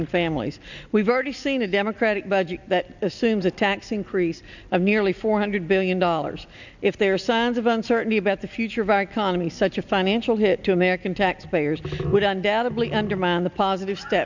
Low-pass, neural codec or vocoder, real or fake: 7.2 kHz; none; real